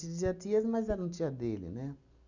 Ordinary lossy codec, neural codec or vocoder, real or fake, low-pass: none; none; real; 7.2 kHz